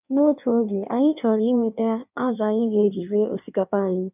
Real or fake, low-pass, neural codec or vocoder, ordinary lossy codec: fake; 3.6 kHz; codec, 16 kHz in and 24 kHz out, 1.1 kbps, FireRedTTS-2 codec; none